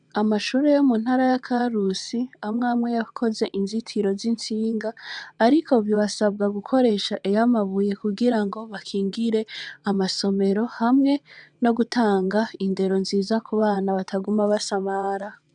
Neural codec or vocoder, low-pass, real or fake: vocoder, 22.05 kHz, 80 mel bands, WaveNeXt; 9.9 kHz; fake